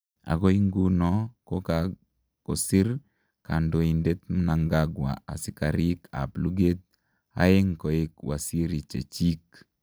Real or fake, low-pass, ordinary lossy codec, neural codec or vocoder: real; none; none; none